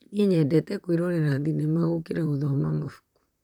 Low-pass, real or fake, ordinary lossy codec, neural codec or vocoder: 19.8 kHz; fake; none; vocoder, 44.1 kHz, 128 mel bands, Pupu-Vocoder